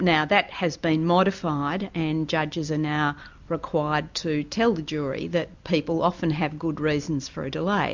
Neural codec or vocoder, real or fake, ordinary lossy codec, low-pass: none; real; MP3, 64 kbps; 7.2 kHz